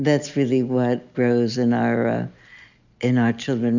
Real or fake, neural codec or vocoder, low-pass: real; none; 7.2 kHz